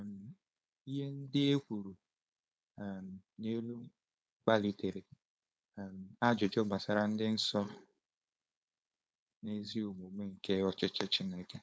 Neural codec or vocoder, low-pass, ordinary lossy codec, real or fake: codec, 16 kHz, 4.8 kbps, FACodec; none; none; fake